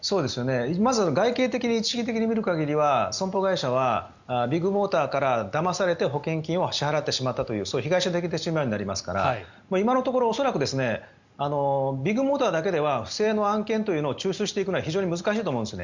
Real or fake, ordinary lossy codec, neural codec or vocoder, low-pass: real; Opus, 64 kbps; none; 7.2 kHz